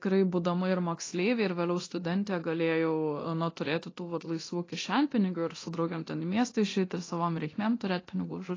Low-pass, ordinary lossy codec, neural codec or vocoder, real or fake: 7.2 kHz; AAC, 32 kbps; codec, 24 kHz, 0.9 kbps, DualCodec; fake